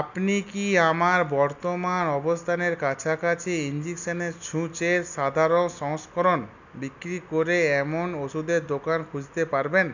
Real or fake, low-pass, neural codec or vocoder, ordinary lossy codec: real; 7.2 kHz; none; none